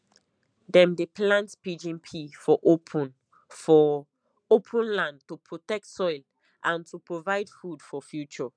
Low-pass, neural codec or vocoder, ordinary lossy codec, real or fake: 9.9 kHz; none; none; real